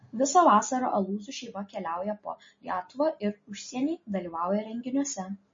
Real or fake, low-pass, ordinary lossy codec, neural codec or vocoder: real; 7.2 kHz; MP3, 32 kbps; none